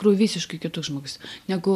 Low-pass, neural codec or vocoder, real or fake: 14.4 kHz; none; real